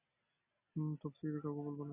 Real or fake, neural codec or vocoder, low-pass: real; none; 3.6 kHz